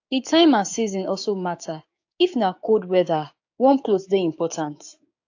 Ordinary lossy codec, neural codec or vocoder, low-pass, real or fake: AAC, 48 kbps; codec, 16 kHz, 6 kbps, DAC; 7.2 kHz; fake